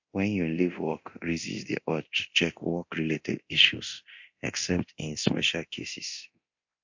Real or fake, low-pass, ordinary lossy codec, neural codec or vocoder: fake; 7.2 kHz; MP3, 48 kbps; codec, 24 kHz, 0.9 kbps, DualCodec